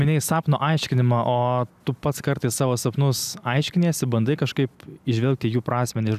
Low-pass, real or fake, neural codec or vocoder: 14.4 kHz; real; none